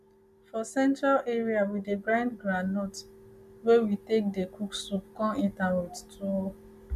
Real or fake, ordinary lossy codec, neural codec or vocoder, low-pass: real; MP3, 96 kbps; none; 14.4 kHz